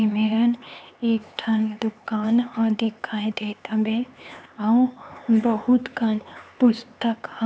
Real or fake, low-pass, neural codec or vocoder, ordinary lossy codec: fake; none; codec, 16 kHz, 4 kbps, X-Codec, HuBERT features, trained on LibriSpeech; none